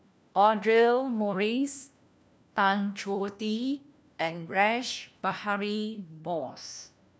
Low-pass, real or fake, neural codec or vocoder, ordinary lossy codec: none; fake; codec, 16 kHz, 1 kbps, FunCodec, trained on LibriTTS, 50 frames a second; none